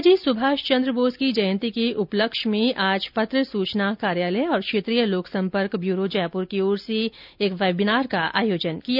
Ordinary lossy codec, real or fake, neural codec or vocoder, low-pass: none; real; none; 5.4 kHz